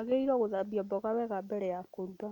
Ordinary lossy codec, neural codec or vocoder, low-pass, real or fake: none; none; 19.8 kHz; real